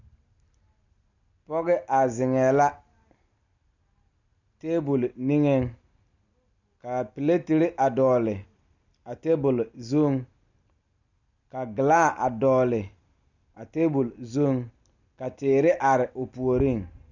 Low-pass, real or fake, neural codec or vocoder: 7.2 kHz; real; none